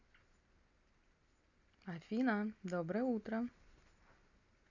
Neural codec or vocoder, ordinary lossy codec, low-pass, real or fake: none; none; 7.2 kHz; real